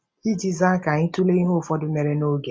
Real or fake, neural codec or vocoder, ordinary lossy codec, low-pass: real; none; none; none